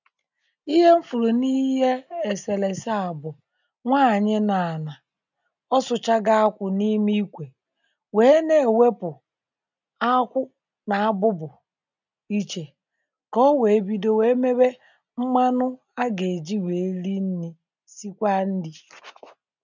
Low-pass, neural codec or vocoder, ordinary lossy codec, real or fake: 7.2 kHz; none; none; real